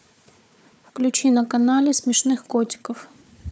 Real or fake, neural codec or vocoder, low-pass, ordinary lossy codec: fake; codec, 16 kHz, 4 kbps, FunCodec, trained on Chinese and English, 50 frames a second; none; none